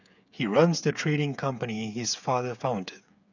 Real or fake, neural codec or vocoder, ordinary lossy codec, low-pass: fake; codec, 16 kHz, 8 kbps, FreqCodec, smaller model; none; 7.2 kHz